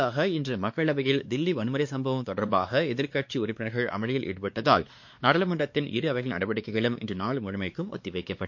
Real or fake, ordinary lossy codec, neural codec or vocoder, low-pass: fake; MP3, 48 kbps; codec, 16 kHz, 2 kbps, X-Codec, WavLM features, trained on Multilingual LibriSpeech; 7.2 kHz